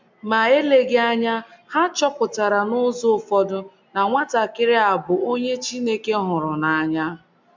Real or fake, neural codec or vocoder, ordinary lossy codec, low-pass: real; none; MP3, 64 kbps; 7.2 kHz